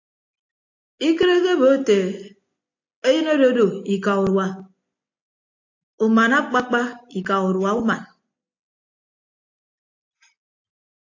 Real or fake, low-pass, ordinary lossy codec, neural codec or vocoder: real; 7.2 kHz; AAC, 48 kbps; none